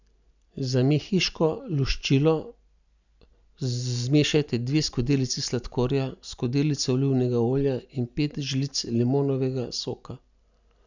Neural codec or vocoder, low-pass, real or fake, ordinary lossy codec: none; 7.2 kHz; real; none